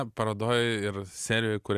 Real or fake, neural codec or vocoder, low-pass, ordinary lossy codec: real; none; 14.4 kHz; Opus, 64 kbps